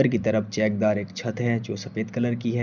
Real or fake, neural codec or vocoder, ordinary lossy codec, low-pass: real; none; none; 7.2 kHz